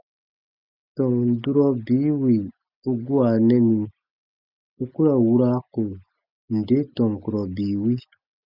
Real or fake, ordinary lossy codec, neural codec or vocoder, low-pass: real; Opus, 64 kbps; none; 5.4 kHz